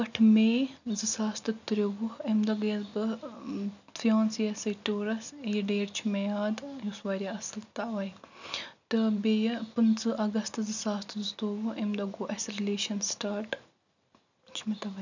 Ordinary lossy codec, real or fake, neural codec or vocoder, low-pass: none; real; none; 7.2 kHz